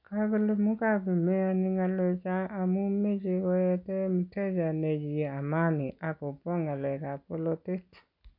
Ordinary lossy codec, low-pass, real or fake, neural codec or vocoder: none; 5.4 kHz; real; none